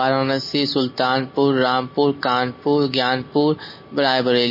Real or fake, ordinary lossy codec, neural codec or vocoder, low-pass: real; MP3, 24 kbps; none; 5.4 kHz